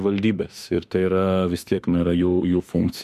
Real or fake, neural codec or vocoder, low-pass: fake; autoencoder, 48 kHz, 32 numbers a frame, DAC-VAE, trained on Japanese speech; 14.4 kHz